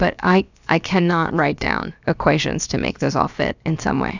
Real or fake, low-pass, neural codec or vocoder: fake; 7.2 kHz; codec, 16 kHz, about 1 kbps, DyCAST, with the encoder's durations